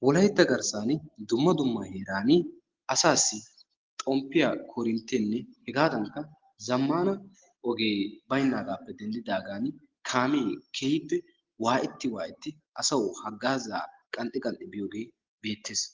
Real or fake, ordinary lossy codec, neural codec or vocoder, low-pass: real; Opus, 16 kbps; none; 7.2 kHz